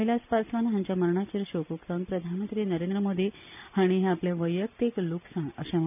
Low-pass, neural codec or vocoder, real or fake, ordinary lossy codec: 3.6 kHz; none; real; none